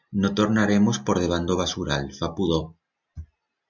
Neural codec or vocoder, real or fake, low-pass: none; real; 7.2 kHz